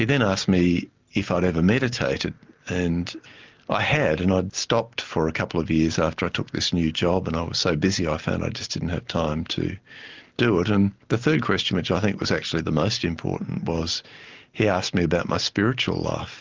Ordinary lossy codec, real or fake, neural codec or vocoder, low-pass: Opus, 32 kbps; real; none; 7.2 kHz